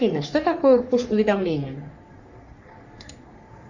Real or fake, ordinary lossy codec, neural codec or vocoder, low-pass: fake; Opus, 64 kbps; codec, 44.1 kHz, 3.4 kbps, Pupu-Codec; 7.2 kHz